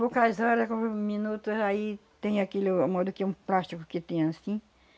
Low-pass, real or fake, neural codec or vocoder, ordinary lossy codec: none; real; none; none